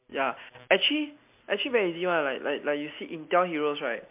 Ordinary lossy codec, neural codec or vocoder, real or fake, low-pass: MP3, 32 kbps; none; real; 3.6 kHz